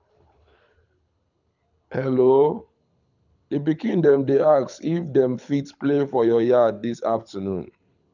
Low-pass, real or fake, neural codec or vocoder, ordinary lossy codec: 7.2 kHz; fake; codec, 24 kHz, 6 kbps, HILCodec; none